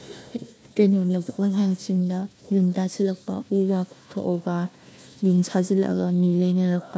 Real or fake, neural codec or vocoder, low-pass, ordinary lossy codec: fake; codec, 16 kHz, 1 kbps, FunCodec, trained on Chinese and English, 50 frames a second; none; none